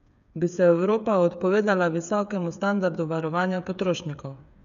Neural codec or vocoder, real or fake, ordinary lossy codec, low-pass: codec, 16 kHz, 8 kbps, FreqCodec, smaller model; fake; none; 7.2 kHz